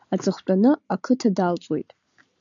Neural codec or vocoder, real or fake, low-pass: none; real; 7.2 kHz